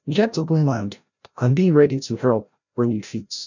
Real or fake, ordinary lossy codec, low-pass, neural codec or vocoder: fake; none; 7.2 kHz; codec, 16 kHz, 0.5 kbps, FreqCodec, larger model